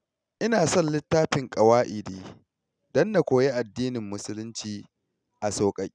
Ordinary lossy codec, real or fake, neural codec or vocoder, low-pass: none; real; none; 9.9 kHz